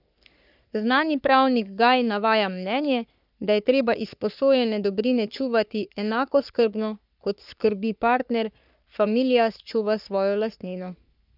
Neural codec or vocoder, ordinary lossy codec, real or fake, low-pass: codec, 44.1 kHz, 3.4 kbps, Pupu-Codec; none; fake; 5.4 kHz